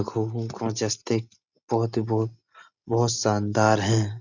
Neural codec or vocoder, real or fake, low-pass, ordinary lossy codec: none; real; 7.2 kHz; none